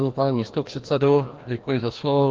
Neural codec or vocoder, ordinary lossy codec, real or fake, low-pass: codec, 16 kHz, 1 kbps, FreqCodec, larger model; Opus, 24 kbps; fake; 7.2 kHz